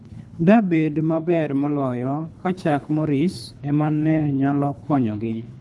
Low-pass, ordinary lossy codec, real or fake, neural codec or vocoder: none; none; fake; codec, 24 kHz, 3 kbps, HILCodec